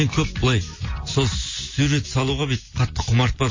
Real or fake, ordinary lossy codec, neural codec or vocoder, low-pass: real; MP3, 32 kbps; none; 7.2 kHz